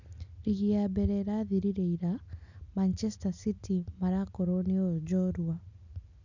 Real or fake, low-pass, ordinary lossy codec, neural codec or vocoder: real; 7.2 kHz; none; none